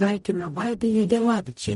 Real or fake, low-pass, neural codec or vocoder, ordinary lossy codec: fake; 19.8 kHz; codec, 44.1 kHz, 0.9 kbps, DAC; MP3, 48 kbps